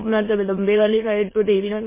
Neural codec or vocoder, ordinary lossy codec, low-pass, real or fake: autoencoder, 22.05 kHz, a latent of 192 numbers a frame, VITS, trained on many speakers; MP3, 16 kbps; 3.6 kHz; fake